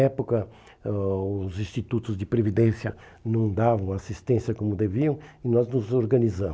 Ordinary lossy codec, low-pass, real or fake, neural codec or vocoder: none; none; real; none